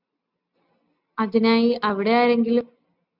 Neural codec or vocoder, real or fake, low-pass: none; real; 5.4 kHz